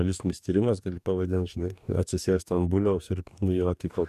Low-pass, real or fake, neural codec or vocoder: 14.4 kHz; fake; codec, 44.1 kHz, 2.6 kbps, DAC